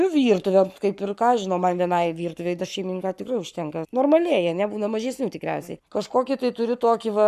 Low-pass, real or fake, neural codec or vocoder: 14.4 kHz; fake; codec, 44.1 kHz, 7.8 kbps, Pupu-Codec